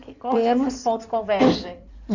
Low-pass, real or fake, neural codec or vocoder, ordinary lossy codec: 7.2 kHz; fake; codec, 16 kHz in and 24 kHz out, 2.2 kbps, FireRedTTS-2 codec; none